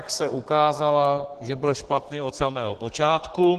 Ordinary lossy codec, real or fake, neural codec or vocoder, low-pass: Opus, 16 kbps; fake; codec, 32 kHz, 1.9 kbps, SNAC; 14.4 kHz